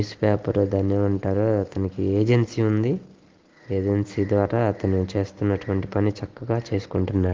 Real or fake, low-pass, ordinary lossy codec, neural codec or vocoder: real; 7.2 kHz; Opus, 16 kbps; none